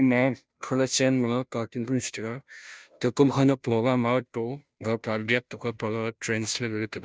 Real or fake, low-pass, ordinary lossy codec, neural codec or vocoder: fake; none; none; codec, 16 kHz, 0.5 kbps, FunCodec, trained on Chinese and English, 25 frames a second